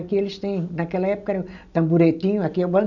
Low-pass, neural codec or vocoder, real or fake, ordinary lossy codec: 7.2 kHz; none; real; none